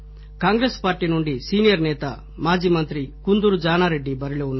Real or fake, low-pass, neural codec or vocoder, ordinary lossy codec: real; 7.2 kHz; none; MP3, 24 kbps